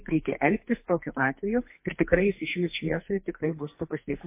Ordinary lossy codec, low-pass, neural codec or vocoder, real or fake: MP3, 24 kbps; 3.6 kHz; codec, 44.1 kHz, 2.6 kbps, SNAC; fake